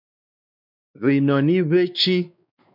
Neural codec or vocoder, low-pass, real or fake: codec, 16 kHz, 2 kbps, X-Codec, WavLM features, trained on Multilingual LibriSpeech; 5.4 kHz; fake